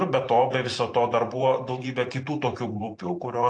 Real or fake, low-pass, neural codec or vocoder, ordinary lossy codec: fake; 9.9 kHz; vocoder, 44.1 kHz, 128 mel bands every 512 samples, BigVGAN v2; AAC, 48 kbps